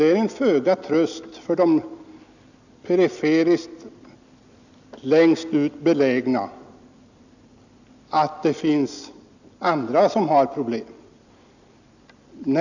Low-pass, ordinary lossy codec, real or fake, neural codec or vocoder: 7.2 kHz; none; real; none